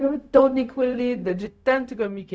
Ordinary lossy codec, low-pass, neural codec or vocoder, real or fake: none; none; codec, 16 kHz, 0.4 kbps, LongCat-Audio-Codec; fake